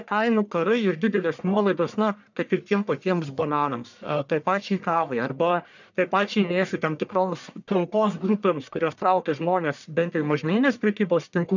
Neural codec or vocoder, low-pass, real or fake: codec, 44.1 kHz, 1.7 kbps, Pupu-Codec; 7.2 kHz; fake